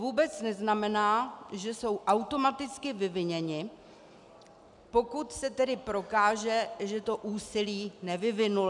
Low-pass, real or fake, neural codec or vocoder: 10.8 kHz; real; none